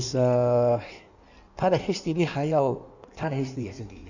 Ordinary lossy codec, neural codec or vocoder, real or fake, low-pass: none; codec, 16 kHz in and 24 kHz out, 1.1 kbps, FireRedTTS-2 codec; fake; 7.2 kHz